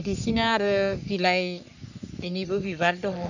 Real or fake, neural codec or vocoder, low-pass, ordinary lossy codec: fake; codec, 44.1 kHz, 3.4 kbps, Pupu-Codec; 7.2 kHz; none